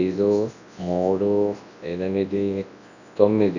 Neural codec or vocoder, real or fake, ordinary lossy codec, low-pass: codec, 24 kHz, 0.9 kbps, WavTokenizer, large speech release; fake; none; 7.2 kHz